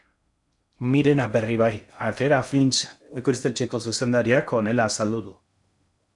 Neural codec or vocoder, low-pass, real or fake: codec, 16 kHz in and 24 kHz out, 0.6 kbps, FocalCodec, streaming, 4096 codes; 10.8 kHz; fake